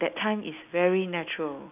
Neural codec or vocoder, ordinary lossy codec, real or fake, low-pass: none; none; real; 3.6 kHz